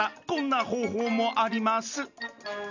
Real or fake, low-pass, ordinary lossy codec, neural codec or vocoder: real; 7.2 kHz; none; none